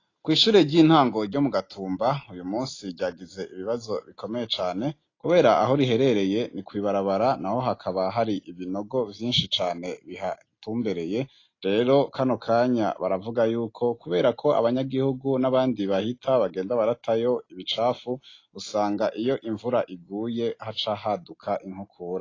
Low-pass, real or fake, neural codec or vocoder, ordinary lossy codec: 7.2 kHz; real; none; AAC, 32 kbps